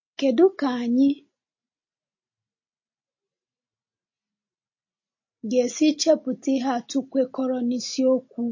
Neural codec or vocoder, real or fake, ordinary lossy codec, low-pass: none; real; MP3, 32 kbps; 7.2 kHz